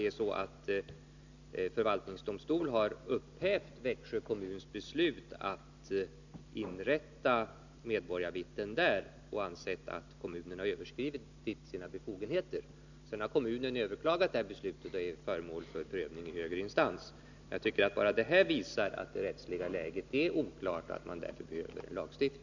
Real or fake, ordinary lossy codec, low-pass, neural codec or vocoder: real; none; 7.2 kHz; none